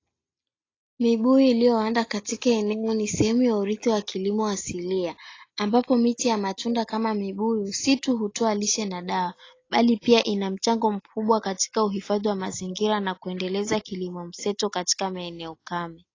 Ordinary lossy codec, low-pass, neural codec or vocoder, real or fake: AAC, 32 kbps; 7.2 kHz; none; real